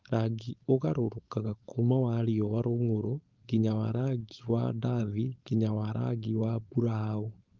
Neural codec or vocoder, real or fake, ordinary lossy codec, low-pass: codec, 16 kHz, 4.8 kbps, FACodec; fake; Opus, 32 kbps; 7.2 kHz